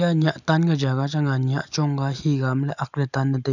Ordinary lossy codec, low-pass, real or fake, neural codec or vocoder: none; 7.2 kHz; real; none